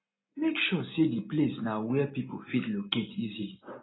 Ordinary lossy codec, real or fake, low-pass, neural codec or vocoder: AAC, 16 kbps; real; 7.2 kHz; none